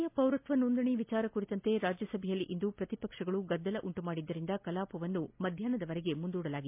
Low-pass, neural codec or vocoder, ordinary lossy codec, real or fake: 3.6 kHz; none; MP3, 32 kbps; real